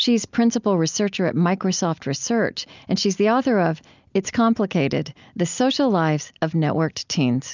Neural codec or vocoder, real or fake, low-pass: none; real; 7.2 kHz